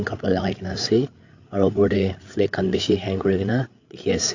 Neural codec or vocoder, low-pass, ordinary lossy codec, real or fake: codec, 16 kHz, 8 kbps, FreqCodec, larger model; 7.2 kHz; AAC, 48 kbps; fake